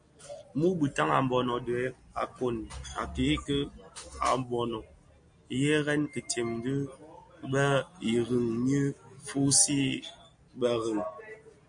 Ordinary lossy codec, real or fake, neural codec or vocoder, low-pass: MP3, 48 kbps; real; none; 9.9 kHz